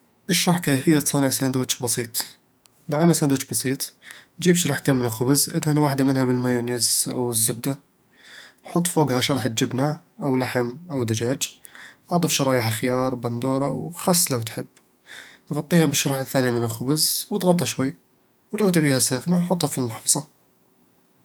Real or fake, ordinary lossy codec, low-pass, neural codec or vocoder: fake; none; none; codec, 44.1 kHz, 2.6 kbps, SNAC